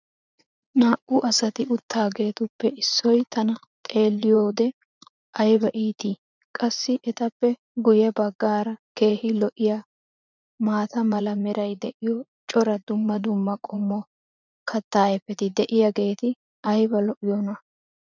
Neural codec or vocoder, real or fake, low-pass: vocoder, 44.1 kHz, 80 mel bands, Vocos; fake; 7.2 kHz